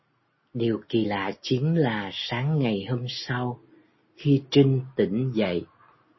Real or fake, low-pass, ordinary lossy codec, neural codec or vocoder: real; 7.2 kHz; MP3, 24 kbps; none